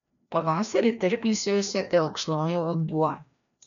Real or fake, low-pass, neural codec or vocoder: fake; 7.2 kHz; codec, 16 kHz, 1 kbps, FreqCodec, larger model